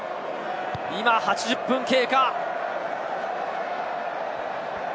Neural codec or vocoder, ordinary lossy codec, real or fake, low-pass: none; none; real; none